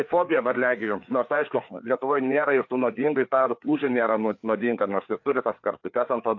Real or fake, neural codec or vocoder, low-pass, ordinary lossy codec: fake; codec, 16 kHz, 4 kbps, FreqCodec, larger model; 7.2 kHz; AAC, 48 kbps